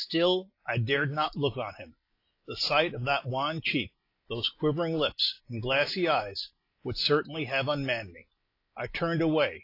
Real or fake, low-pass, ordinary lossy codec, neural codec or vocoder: real; 5.4 kHz; AAC, 32 kbps; none